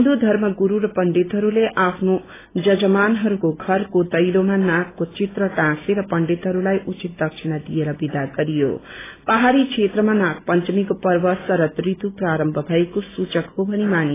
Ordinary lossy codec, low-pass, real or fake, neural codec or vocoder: AAC, 16 kbps; 3.6 kHz; real; none